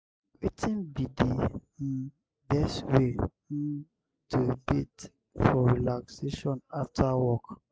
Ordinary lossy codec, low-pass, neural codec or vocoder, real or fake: none; none; none; real